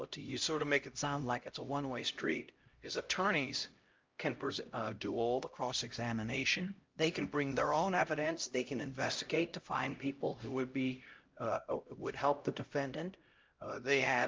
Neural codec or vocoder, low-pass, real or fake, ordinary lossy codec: codec, 16 kHz, 0.5 kbps, X-Codec, HuBERT features, trained on LibriSpeech; 7.2 kHz; fake; Opus, 32 kbps